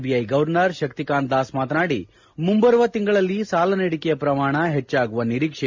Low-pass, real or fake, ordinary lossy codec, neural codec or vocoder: 7.2 kHz; real; MP3, 48 kbps; none